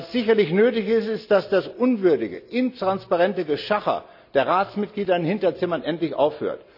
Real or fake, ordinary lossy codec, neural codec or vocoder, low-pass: real; none; none; 5.4 kHz